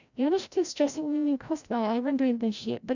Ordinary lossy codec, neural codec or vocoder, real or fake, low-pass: none; codec, 16 kHz, 0.5 kbps, FreqCodec, larger model; fake; 7.2 kHz